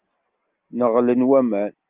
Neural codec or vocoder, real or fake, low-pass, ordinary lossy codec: none; real; 3.6 kHz; Opus, 24 kbps